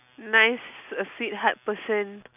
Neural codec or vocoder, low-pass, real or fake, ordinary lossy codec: none; 3.6 kHz; real; none